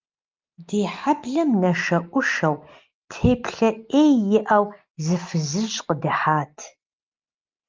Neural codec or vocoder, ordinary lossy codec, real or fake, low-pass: none; Opus, 24 kbps; real; 7.2 kHz